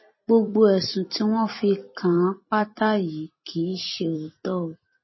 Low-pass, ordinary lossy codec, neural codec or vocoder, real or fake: 7.2 kHz; MP3, 24 kbps; vocoder, 44.1 kHz, 128 mel bands every 256 samples, BigVGAN v2; fake